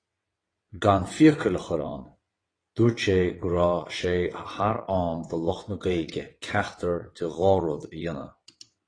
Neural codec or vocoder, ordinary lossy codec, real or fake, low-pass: vocoder, 22.05 kHz, 80 mel bands, WaveNeXt; AAC, 32 kbps; fake; 9.9 kHz